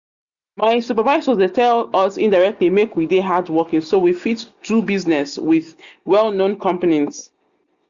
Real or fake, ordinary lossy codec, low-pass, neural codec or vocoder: real; Opus, 64 kbps; 7.2 kHz; none